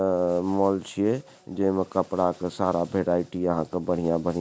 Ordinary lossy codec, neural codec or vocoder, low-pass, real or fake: none; none; none; real